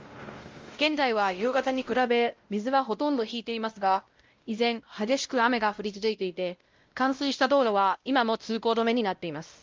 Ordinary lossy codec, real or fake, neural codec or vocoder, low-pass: Opus, 32 kbps; fake; codec, 16 kHz, 0.5 kbps, X-Codec, WavLM features, trained on Multilingual LibriSpeech; 7.2 kHz